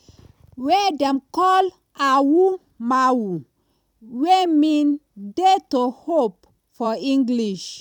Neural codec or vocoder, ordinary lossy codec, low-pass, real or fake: vocoder, 44.1 kHz, 128 mel bands every 256 samples, BigVGAN v2; none; 19.8 kHz; fake